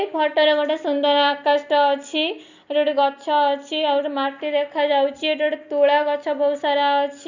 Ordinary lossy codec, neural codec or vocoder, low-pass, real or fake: none; none; 7.2 kHz; real